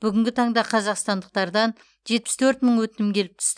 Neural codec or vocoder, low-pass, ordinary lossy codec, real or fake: none; 9.9 kHz; none; real